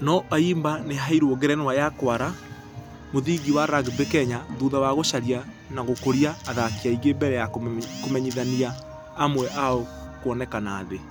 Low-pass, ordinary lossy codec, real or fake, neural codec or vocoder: none; none; real; none